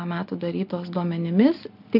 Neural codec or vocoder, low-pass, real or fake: none; 5.4 kHz; real